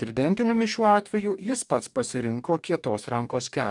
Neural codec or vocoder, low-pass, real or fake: codec, 44.1 kHz, 2.6 kbps, DAC; 10.8 kHz; fake